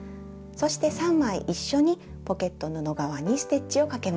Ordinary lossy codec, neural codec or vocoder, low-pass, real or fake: none; none; none; real